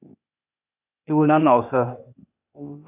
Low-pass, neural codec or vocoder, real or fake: 3.6 kHz; codec, 16 kHz, 0.8 kbps, ZipCodec; fake